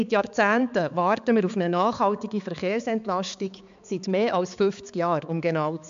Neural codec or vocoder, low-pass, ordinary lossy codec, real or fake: codec, 16 kHz, 4 kbps, X-Codec, HuBERT features, trained on balanced general audio; 7.2 kHz; MP3, 64 kbps; fake